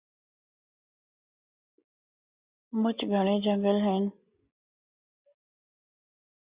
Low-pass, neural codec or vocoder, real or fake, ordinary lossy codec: 3.6 kHz; none; real; Opus, 64 kbps